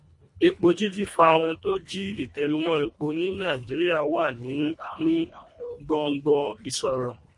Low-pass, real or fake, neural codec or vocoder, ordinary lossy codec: 10.8 kHz; fake; codec, 24 kHz, 1.5 kbps, HILCodec; MP3, 48 kbps